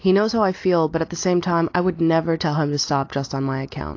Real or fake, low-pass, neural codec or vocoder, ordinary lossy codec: real; 7.2 kHz; none; AAC, 48 kbps